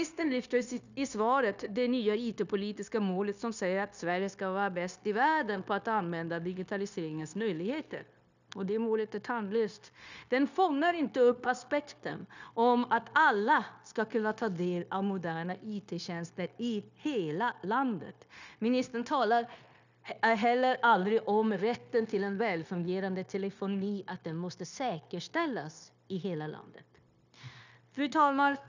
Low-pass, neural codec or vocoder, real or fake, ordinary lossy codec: 7.2 kHz; codec, 16 kHz, 0.9 kbps, LongCat-Audio-Codec; fake; none